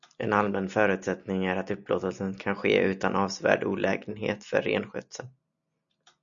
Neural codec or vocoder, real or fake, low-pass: none; real; 7.2 kHz